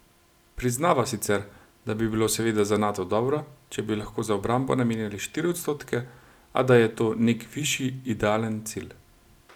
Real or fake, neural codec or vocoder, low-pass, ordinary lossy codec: real; none; 19.8 kHz; none